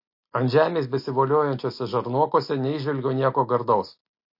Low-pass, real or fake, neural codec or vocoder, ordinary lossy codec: 5.4 kHz; real; none; MP3, 32 kbps